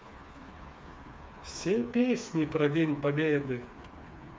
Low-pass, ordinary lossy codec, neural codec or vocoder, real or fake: none; none; codec, 16 kHz, 4 kbps, FreqCodec, smaller model; fake